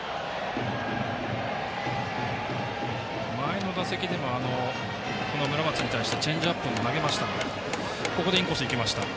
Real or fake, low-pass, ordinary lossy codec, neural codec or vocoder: real; none; none; none